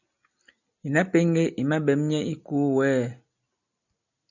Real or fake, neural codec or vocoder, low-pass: real; none; 7.2 kHz